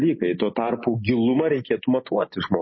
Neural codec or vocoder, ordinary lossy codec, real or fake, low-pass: none; MP3, 24 kbps; real; 7.2 kHz